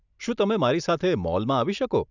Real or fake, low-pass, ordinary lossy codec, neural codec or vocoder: real; 7.2 kHz; none; none